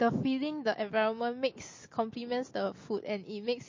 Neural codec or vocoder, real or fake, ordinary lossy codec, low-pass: none; real; MP3, 32 kbps; 7.2 kHz